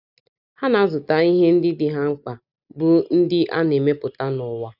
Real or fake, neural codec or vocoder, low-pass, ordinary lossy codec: real; none; 5.4 kHz; none